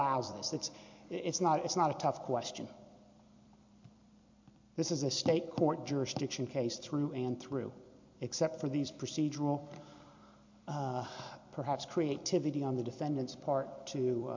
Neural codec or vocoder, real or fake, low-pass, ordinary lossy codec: vocoder, 44.1 kHz, 128 mel bands every 256 samples, BigVGAN v2; fake; 7.2 kHz; MP3, 48 kbps